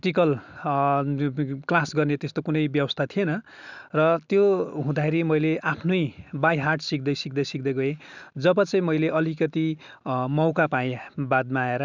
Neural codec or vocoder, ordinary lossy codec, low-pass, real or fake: none; none; 7.2 kHz; real